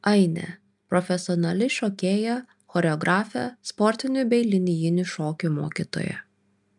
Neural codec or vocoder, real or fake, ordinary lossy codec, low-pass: none; real; MP3, 96 kbps; 10.8 kHz